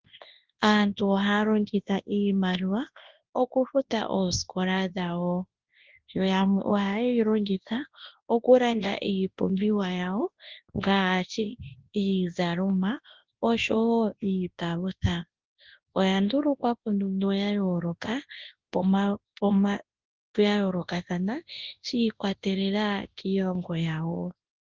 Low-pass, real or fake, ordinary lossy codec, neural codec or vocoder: 7.2 kHz; fake; Opus, 16 kbps; codec, 24 kHz, 0.9 kbps, WavTokenizer, large speech release